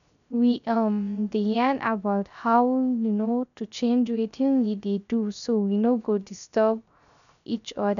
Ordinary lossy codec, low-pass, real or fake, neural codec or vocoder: none; 7.2 kHz; fake; codec, 16 kHz, 0.3 kbps, FocalCodec